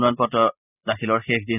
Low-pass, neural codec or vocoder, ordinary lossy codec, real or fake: 3.6 kHz; none; none; real